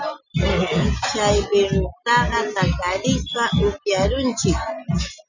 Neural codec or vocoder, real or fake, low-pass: none; real; 7.2 kHz